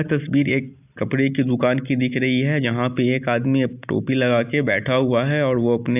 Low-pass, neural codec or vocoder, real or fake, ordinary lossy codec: 3.6 kHz; none; real; AAC, 32 kbps